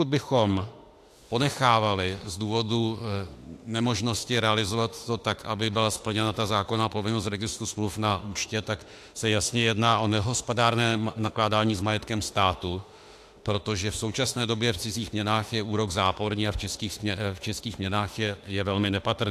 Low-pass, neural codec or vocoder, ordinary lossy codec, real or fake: 14.4 kHz; autoencoder, 48 kHz, 32 numbers a frame, DAC-VAE, trained on Japanese speech; AAC, 64 kbps; fake